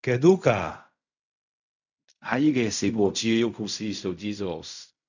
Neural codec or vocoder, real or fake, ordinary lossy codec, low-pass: codec, 16 kHz in and 24 kHz out, 0.4 kbps, LongCat-Audio-Codec, fine tuned four codebook decoder; fake; none; 7.2 kHz